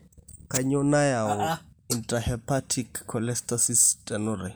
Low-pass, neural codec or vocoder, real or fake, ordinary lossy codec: none; none; real; none